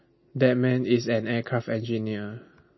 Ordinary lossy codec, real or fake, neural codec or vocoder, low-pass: MP3, 24 kbps; real; none; 7.2 kHz